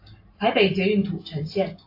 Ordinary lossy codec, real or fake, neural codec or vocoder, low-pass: AAC, 32 kbps; real; none; 5.4 kHz